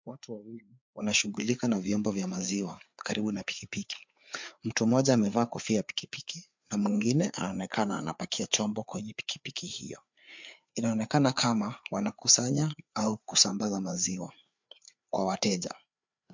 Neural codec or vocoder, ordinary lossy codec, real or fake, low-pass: codec, 16 kHz, 4 kbps, FreqCodec, larger model; MP3, 64 kbps; fake; 7.2 kHz